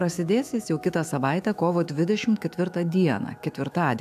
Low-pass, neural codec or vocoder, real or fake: 14.4 kHz; autoencoder, 48 kHz, 128 numbers a frame, DAC-VAE, trained on Japanese speech; fake